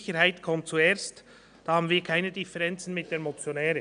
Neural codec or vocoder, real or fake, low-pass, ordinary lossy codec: none; real; 9.9 kHz; none